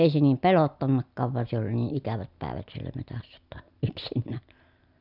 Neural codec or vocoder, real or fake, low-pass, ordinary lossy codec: none; real; 5.4 kHz; none